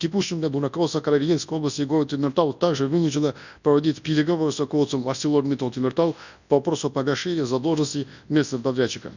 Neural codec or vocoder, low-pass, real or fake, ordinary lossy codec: codec, 24 kHz, 0.9 kbps, WavTokenizer, large speech release; 7.2 kHz; fake; none